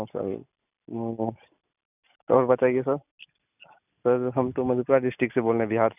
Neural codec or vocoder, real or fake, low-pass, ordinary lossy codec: none; real; 3.6 kHz; none